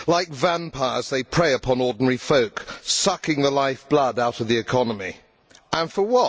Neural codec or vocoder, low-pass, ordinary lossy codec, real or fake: none; none; none; real